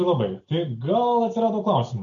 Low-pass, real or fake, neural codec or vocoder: 7.2 kHz; real; none